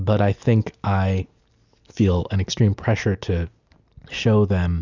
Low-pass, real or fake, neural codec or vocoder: 7.2 kHz; real; none